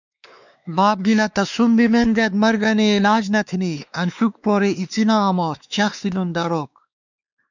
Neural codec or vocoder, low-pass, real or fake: codec, 16 kHz, 2 kbps, X-Codec, WavLM features, trained on Multilingual LibriSpeech; 7.2 kHz; fake